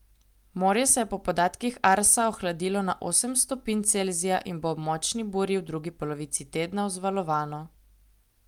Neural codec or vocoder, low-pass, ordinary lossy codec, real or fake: none; 19.8 kHz; Opus, 32 kbps; real